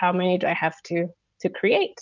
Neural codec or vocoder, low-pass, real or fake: none; 7.2 kHz; real